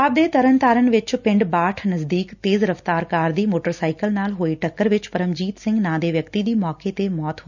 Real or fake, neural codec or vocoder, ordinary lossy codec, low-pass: real; none; none; 7.2 kHz